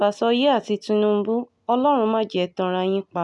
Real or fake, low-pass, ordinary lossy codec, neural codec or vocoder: real; 10.8 kHz; none; none